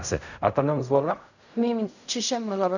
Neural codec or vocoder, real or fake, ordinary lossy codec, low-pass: codec, 16 kHz in and 24 kHz out, 0.4 kbps, LongCat-Audio-Codec, fine tuned four codebook decoder; fake; none; 7.2 kHz